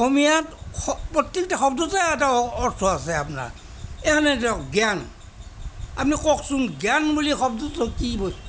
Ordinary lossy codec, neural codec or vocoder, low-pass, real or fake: none; none; none; real